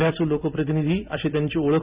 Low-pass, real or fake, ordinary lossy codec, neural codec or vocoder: 3.6 kHz; fake; Opus, 64 kbps; vocoder, 44.1 kHz, 128 mel bands every 256 samples, BigVGAN v2